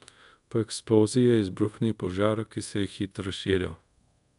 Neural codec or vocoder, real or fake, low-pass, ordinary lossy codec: codec, 24 kHz, 0.5 kbps, DualCodec; fake; 10.8 kHz; none